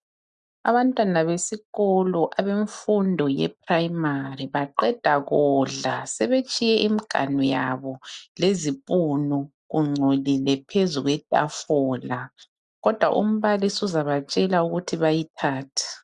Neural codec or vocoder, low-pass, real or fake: none; 10.8 kHz; real